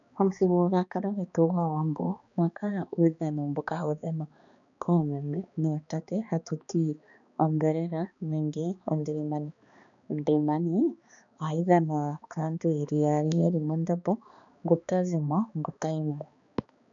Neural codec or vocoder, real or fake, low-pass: codec, 16 kHz, 2 kbps, X-Codec, HuBERT features, trained on balanced general audio; fake; 7.2 kHz